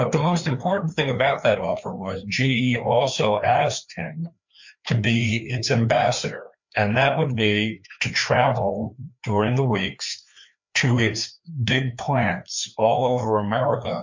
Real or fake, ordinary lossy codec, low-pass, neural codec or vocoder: fake; MP3, 48 kbps; 7.2 kHz; codec, 16 kHz, 2 kbps, FreqCodec, larger model